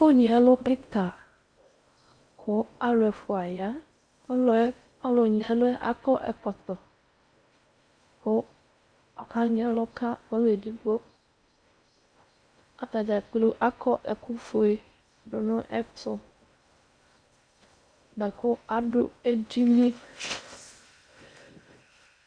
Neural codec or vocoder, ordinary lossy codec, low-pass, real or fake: codec, 16 kHz in and 24 kHz out, 0.6 kbps, FocalCodec, streaming, 4096 codes; Opus, 64 kbps; 9.9 kHz; fake